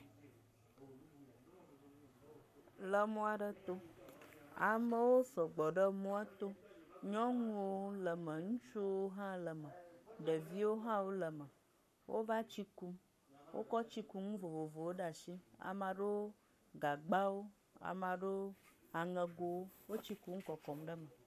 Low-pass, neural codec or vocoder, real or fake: 14.4 kHz; codec, 44.1 kHz, 7.8 kbps, Pupu-Codec; fake